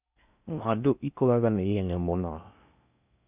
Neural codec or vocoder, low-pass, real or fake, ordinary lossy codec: codec, 16 kHz in and 24 kHz out, 0.6 kbps, FocalCodec, streaming, 4096 codes; 3.6 kHz; fake; none